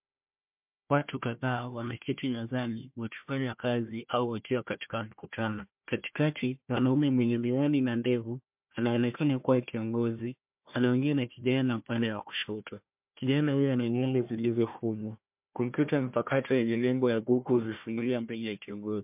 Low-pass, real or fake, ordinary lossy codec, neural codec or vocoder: 3.6 kHz; fake; MP3, 32 kbps; codec, 16 kHz, 1 kbps, FunCodec, trained on Chinese and English, 50 frames a second